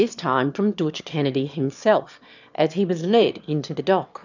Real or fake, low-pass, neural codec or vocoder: fake; 7.2 kHz; autoencoder, 22.05 kHz, a latent of 192 numbers a frame, VITS, trained on one speaker